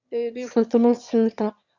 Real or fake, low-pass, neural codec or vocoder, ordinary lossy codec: fake; 7.2 kHz; autoencoder, 22.05 kHz, a latent of 192 numbers a frame, VITS, trained on one speaker; Opus, 64 kbps